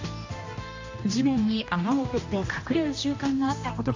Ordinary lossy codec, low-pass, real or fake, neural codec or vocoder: AAC, 48 kbps; 7.2 kHz; fake; codec, 16 kHz, 1 kbps, X-Codec, HuBERT features, trained on general audio